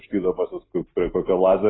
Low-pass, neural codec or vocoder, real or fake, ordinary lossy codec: 7.2 kHz; none; real; AAC, 16 kbps